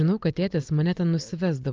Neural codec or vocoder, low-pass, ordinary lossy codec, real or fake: none; 7.2 kHz; Opus, 24 kbps; real